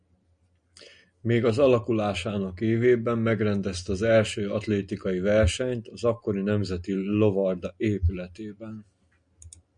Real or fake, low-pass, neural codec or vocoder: real; 9.9 kHz; none